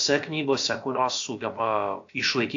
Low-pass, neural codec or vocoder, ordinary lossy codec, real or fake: 7.2 kHz; codec, 16 kHz, about 1 kbps, DyCAST, with the encoder's durations; AAC, 48 kbps; fake